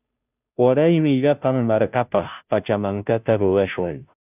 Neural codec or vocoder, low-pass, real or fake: codec, 16 kHz, 0.5 kbps, FunCodec, trained on Chinese and English, 25 frames a second; 3.6 kHz; fake